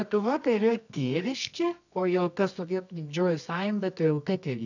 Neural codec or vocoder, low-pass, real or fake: codec, 24 kHz, 0.9 kbps, WavTokenizer, medium music audio release; 7.2 kHz; fake